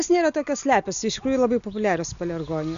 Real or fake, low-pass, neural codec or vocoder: real; 7.2 kHz; none